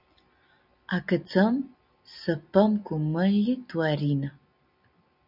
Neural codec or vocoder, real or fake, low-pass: none; real; 5.4 kHz